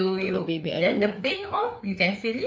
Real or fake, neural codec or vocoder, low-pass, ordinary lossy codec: fake; codec, 16 kHz, 4 kbps, FreqCodec, larger model; none; none